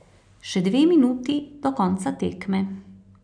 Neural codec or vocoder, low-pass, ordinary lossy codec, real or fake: none; 9.9 kHz; none; real